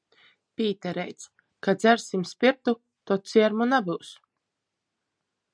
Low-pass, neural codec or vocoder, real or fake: 9.9 kHz; none; real